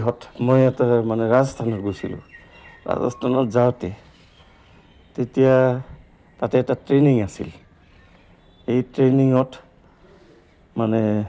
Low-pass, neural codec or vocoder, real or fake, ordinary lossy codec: none; none; real; none